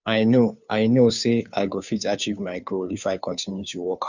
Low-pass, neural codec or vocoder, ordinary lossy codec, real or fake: 7.2 kHz; codec, 16 kHz, 2 kbps, FunCodec, trained on Chinese and English, 25 frames a second; none; fake